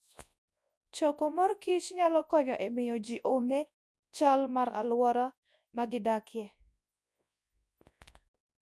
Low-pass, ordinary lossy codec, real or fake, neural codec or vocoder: none; none; fake; codec, 24 kHz, 0.9 kbps, WavTokenizer, large speech release